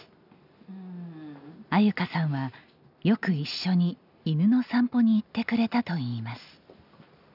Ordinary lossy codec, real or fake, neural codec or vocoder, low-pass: none; real; none; 5.4 kHz